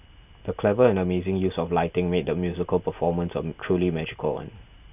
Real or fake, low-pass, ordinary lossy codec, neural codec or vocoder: fake; 3.6 kHz; none; vocoder, 44.1 kHz, 128 mel bands every 256 samples, BigVGAN v2